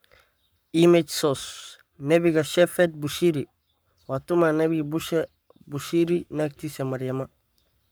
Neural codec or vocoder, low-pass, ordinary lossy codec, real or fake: codec, 44.1 kHz, 7.8 kbps, Pupu-Codec; none; none; fake